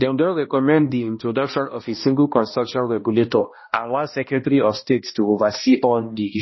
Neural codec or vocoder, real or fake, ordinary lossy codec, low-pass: codec, 16 kHz, 1 kbps, X-Codec, HuBERT features, trained on balanced general audio; fake; MP3, 24 kbps; 7.2 kHz